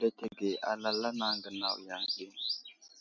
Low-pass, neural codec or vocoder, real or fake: 7.2 kHz; none; real